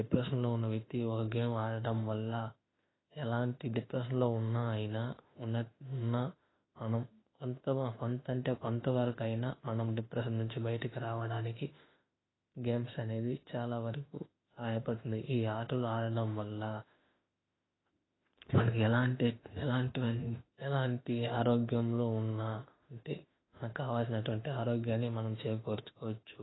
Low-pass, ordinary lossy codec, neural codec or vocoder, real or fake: 7.2 kHz; AAC, 16 kbps; autoencoder, 48 kHz, 32 numbers a frame, DAC-VAE, trained on Japanese speech; fake